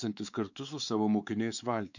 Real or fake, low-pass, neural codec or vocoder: fake; 7.2 kHz; codec, 16 kHz, 4 kbps, X-Codec, WavLM features, trained on Multilingual LibriSpeech